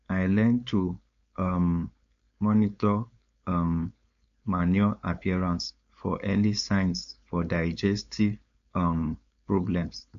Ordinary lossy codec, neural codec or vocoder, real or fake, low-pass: AAC, 64 kbps; codec, 16 kHz, 4.8 kbps, FACodec; fake; 7.2 kHz